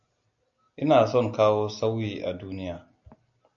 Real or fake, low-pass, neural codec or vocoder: real; 7.2 kHz; none